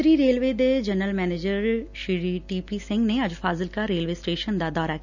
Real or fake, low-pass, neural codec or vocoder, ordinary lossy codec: real; 7.2 kHz; none; none